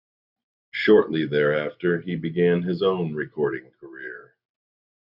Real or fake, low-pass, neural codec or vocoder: real; 5.4 kHz; none